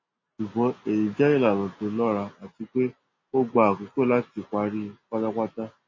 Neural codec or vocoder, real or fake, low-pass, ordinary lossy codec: none; real; 7.2 kHz; MP3, 32 kbps